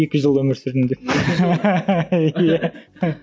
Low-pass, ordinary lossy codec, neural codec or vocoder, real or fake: none; none; none; real